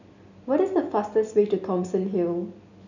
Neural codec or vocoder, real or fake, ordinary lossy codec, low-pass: none; real; none; 7.2 kHz